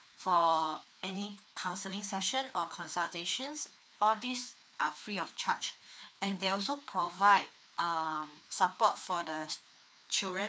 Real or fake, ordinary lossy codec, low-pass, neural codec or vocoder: fake; none; none; codec, 16 kHz, 2 kbps, FreqCodec, larger model